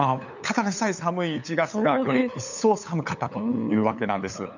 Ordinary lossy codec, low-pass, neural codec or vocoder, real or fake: none; 7.2 kHz; codec, 16 kHz, 8 kbps, FunCodec, trained on LibriTTS, 25 frames a second; fake